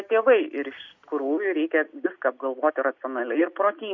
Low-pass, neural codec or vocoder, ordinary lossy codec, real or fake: 7.2 kHz; none; MP3, 48 kbps; real